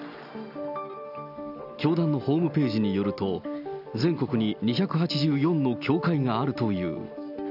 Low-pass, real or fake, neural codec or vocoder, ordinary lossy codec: 5.4 kHz; real; none; none